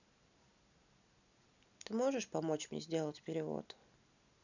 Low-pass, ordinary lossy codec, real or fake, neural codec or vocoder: 7.2 kHz; none; real; none